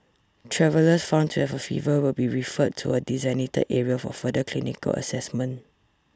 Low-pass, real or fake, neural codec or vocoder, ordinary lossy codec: none; real; none; none